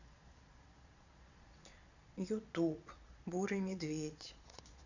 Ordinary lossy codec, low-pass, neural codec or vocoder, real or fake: none; 7.2 kHz; vocoder, 22.05 kHz, 80 mel bands, Vocos; fake